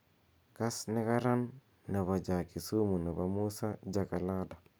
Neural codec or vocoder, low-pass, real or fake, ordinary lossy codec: none; none; real; none